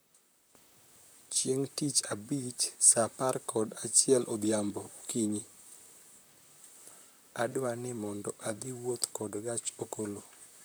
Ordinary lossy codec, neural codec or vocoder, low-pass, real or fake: none; vocoder, 44.1 kHz, 128 mel bands, Pupu-Vocoder; none; fake